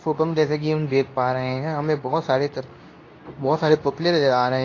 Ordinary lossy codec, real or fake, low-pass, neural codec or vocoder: AAC, 32 kbps; fake; 7.2 kHz; codec, 24 kHz, 0.9 kbps, WavTokenizer, medium speech release version 2